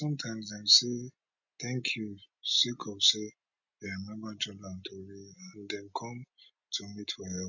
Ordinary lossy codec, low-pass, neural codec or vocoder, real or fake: none; 7.2 kHz; none; real